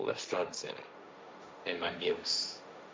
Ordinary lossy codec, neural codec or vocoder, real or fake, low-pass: none; codec, 16 kHz, 1.1 kbps, Voila-Tokenizer; fake; none